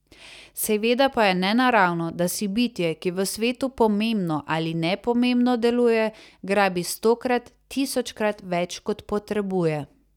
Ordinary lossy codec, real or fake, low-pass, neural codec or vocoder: none; real; 19.8 kHz; none